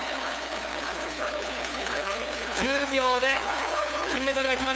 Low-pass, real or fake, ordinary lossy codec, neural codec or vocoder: none; fake; none; codec, 16 kHz, 2 kbps, FunCodec, trained on LibriTTS, 25 frames a second